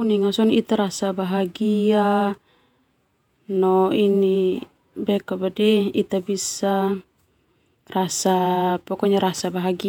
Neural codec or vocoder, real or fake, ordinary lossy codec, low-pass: vocoder, 48 kHz, 128 mel bands, Vocos; fake; none; 19.8 kHz